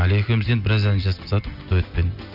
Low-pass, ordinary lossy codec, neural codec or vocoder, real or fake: 5.4 kHz; none; none; real